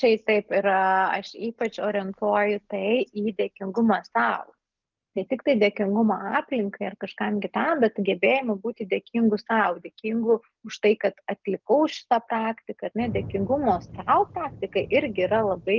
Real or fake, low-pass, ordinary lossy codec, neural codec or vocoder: real; 7.2 kHz; Opus, 24 kbps; none